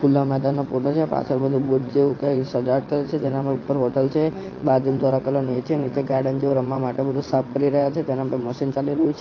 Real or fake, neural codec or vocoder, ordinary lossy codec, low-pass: fake; vocoder, 22.05 kHz, 80 mel bands, WaveNeXt; AAC, 32 kbps; 7.2 kHz